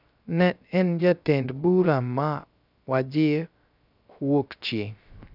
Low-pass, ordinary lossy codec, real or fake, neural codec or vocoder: 5.4 kHz; none; fake; codec, 16 kHz, 0.3 kbps, FocalCodec